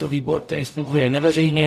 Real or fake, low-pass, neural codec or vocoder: fake; 14.4 kHz; codec, 44.1 kHz, 0.9 kbps, DAC